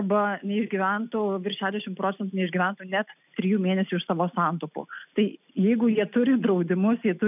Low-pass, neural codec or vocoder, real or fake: 3.6 kHz; none; real